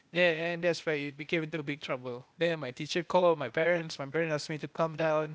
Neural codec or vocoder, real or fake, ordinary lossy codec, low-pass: codec, 16 kHz, 0.8 kbps, ZipCodec; fake; none; none